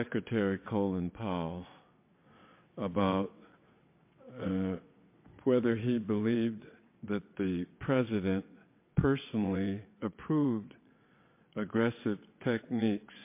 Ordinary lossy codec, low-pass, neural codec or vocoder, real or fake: MP3, 24 kbps; 3.6 kHz; vocoder, 22.05 kHz, 80 mel bands, WaveNeXt; fake